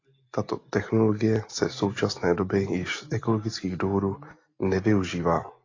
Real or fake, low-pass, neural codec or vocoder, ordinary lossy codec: real; 7.2 kHz; none; AAC, 32 kbps